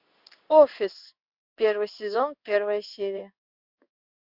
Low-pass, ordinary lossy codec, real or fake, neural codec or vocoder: 5.4 kHz; Opus, 64 kbps; fake; codec, 16 kHz in and 24 kHz out, 1 kbps, XY-Tokenizer